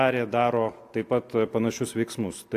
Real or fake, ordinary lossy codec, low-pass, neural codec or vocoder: real; AAC, 64 kbps; 14.4 kHz; none